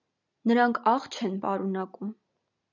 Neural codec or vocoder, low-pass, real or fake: none; 7.2 kHz; real